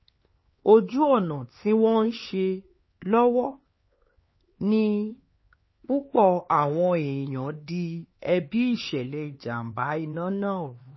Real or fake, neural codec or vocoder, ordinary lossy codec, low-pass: fake; codec, 16 kHz, 4 kbps, X-Codec, HuBERT features, trained on LibriSpeech; MP3, 24 kbps; 7.2 kHz